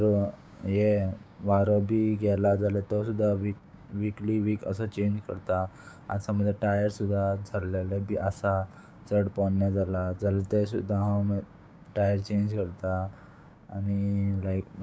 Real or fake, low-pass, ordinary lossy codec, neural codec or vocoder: fake; none; none; codec, 16 kHz, 6 kbps, DAC